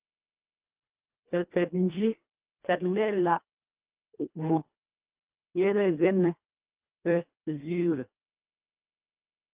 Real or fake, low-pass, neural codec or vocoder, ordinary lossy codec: fake; 3.6 kHz; codec, 24 kHz, 1.5 kbps, HILCodec; Opus, 24 kbps